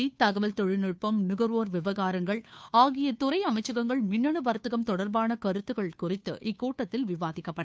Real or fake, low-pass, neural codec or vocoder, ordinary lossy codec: fake; none; codec, 16 kHz, 2 kbps, FunCodec, trained on Chinese and English, 25 frames a second; none